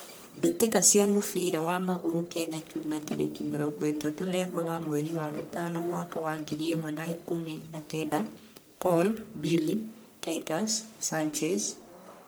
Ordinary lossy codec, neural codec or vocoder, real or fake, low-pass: none; codec, 44.1 kHz, 1.7 kbps, Pupu-Codec; fake; none